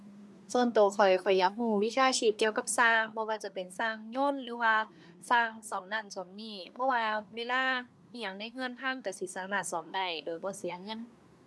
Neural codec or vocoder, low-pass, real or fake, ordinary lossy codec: codec, 24 kHz, 1 kbps, SNAC; none; fake; none